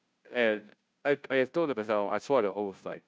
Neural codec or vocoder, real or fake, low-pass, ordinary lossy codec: codec, 16 kHz, 0.5 kbps, FunCodec, trained on Chinese and English, 25 frames a second; fake; none; none